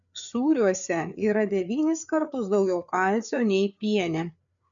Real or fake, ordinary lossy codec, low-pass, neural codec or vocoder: fake; MP3, 96 kbps; 7.2 kHz; codec, 16 kHz, 4 kbps, FreqCodec, larger model